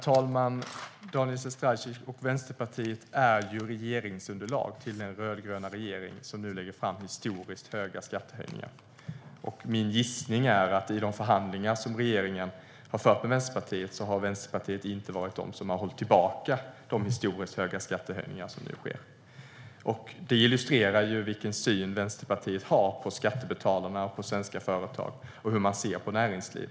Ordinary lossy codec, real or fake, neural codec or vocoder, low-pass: none; real; none; none